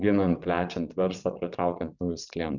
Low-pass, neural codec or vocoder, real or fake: 7.2 kHz; vocoder, 22.05 kHz, 80 mel bands, WaveNeXt; fake